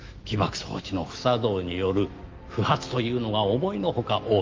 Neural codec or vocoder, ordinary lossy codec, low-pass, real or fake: autoencoder, 48 kHz, 128 numbers a frame, DAC-VAE, trained on Japanese speech; Opus, 32 kbps; 7.2 kHz; fake